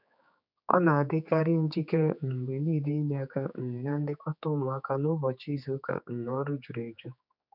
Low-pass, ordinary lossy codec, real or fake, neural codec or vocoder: 5.4 kHz; none; fake; codec, 16 kHz, 4 kbps, X-Codec, HuBERT features, trained on general audio